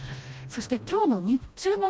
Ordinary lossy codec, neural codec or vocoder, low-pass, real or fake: none; codec, 16 kHz, 1 kbps, FreqCodec, smaller model; none; fake